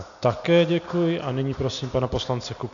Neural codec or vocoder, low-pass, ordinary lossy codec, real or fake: none; 7.2 kHz; MP3, 96 kbps; real